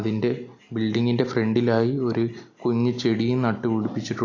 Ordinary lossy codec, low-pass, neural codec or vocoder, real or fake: AAC, 48 kbps; 7.2 kHz; none; real